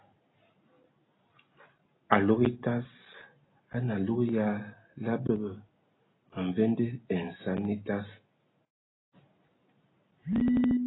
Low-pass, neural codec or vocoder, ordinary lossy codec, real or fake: 7.2 kHz; none; AAC, 16 kbps; real